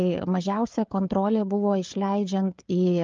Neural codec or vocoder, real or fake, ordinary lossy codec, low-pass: codec, 16 kHz, 16 kbps, FreqCodec, smaller model; fake; Opus, 24 kbps; 7.2 kHz